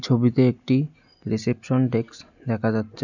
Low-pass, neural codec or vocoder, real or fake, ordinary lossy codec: 7.2 kHz; none; real; none